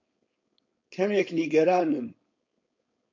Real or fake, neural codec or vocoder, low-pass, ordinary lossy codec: fake; codec, 16 kHz, 4.8 kbps, FACodec; 7.2 kHz; MP3, 48 kbps